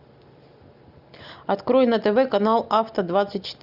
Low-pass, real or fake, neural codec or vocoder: 5.4 kHz; real; none